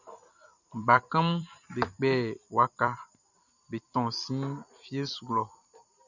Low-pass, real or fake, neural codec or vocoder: 7.2 kHz; fake; vocoder, 44.1 kHz, 128 mel bands every 256 samples, BigVGAN v2